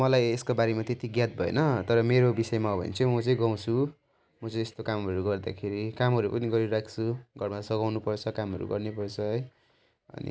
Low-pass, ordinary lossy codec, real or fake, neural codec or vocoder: none; none; real; none